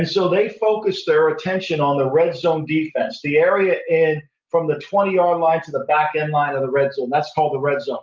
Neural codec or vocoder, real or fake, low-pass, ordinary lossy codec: none; real; 7.2 kHz; Opus, 24 kbps